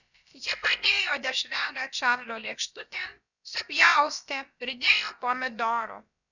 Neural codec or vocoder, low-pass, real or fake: codec, 16 kHz, about 1 kbps, DyCAST, with the encoder's durations; 7.2 kHz; fake